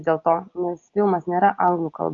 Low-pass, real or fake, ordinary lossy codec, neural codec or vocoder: 7.2 kHz; real; AAC, 48 kbps; none